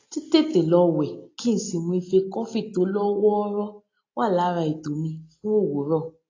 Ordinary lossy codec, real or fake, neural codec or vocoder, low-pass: AAC, 32 kbps; real; none; 7.2 kHz